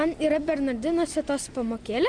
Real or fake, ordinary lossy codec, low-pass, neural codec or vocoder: real; MP3, 96 kbps; 9.9 kHz; none